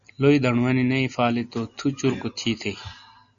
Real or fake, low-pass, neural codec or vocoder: real; 7.2 kHz; none